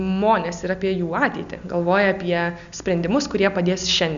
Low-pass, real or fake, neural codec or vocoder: 7.2 kHz; real; none